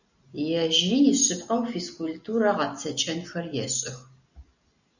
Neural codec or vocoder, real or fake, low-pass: none; real; 7.2 kHz